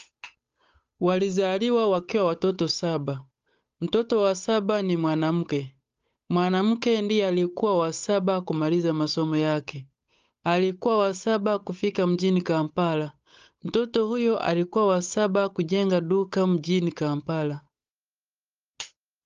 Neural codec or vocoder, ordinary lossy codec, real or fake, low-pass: codec, 16 kHz, 8 kbps, FunCodec, trained on Chinese and English, 25 frames a second; Opus, 32 kbps; fake; 7.2 kHz